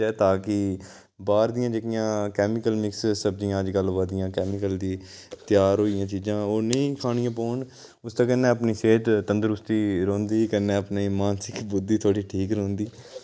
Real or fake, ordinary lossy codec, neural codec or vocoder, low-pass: real; none; none; none